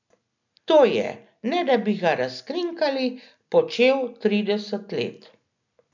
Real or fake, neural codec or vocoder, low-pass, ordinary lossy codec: real; none; 7.2 kHz; none